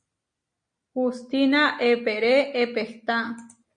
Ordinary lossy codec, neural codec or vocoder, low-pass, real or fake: MP3, 48 kbps; none; 9.9 kHz; real